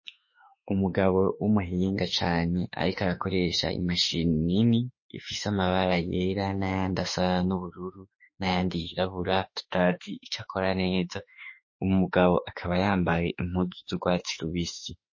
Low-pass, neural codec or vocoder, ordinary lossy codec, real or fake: 7.2 kHz; autoencoder, 48 kHz, 32 numbers a frame, DAC-VAE, trained on Japanese speech; MP3, 32 kbps; fake